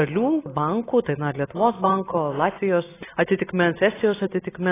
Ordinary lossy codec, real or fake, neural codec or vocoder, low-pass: AAC, 16 kbps; real; none; 3.6 kHz